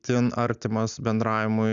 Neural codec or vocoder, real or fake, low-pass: none; real; 7.2 kHz